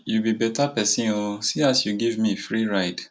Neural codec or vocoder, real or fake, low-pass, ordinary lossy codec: none; real; none; none